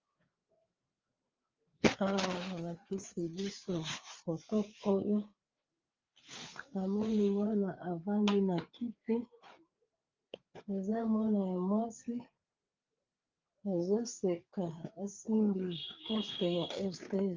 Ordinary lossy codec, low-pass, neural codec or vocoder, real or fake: Opus, 32 kbps; 7.2 kHz; codec, 16 kHz, 4 kbps, FreqCodec, larger model; fake